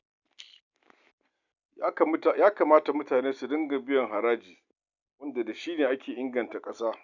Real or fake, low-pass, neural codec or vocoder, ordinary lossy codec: real; 7.2 kHz; none; none